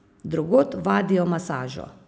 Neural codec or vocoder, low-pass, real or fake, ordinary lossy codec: none; none; real; none